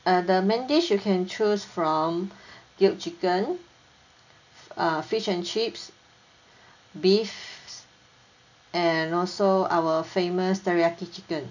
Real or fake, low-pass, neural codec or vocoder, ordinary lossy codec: real; 7.2 kHz; none; none